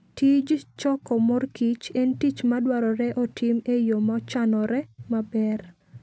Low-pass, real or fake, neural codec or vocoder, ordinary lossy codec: none; real; none; none